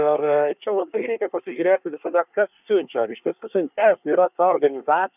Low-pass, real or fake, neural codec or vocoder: 3.6 kHz; fake; codec, 24 kHz, 1 kbps, SNAC